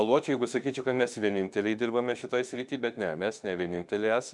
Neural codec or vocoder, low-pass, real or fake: autoencoder, 48 kHz, 32 numbers a frame, DAC-VAE, trained on Japanese speech; 10.8 kHz; fake